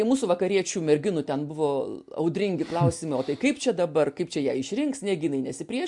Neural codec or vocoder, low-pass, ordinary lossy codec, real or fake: none; 10.8 kHz; MP3, 64 kbps; real